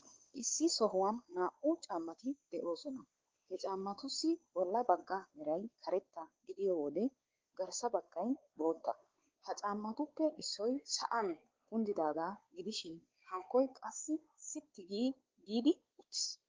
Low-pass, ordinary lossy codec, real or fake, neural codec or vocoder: 7.2 kHz; Opus, 16 kbps; fake; codec, 16 kHz, 4 kbps, X-Codec, WavLM features, trained on Multilingual LibriSpeech